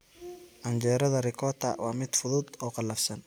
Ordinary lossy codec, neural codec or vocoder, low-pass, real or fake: none; none; none; real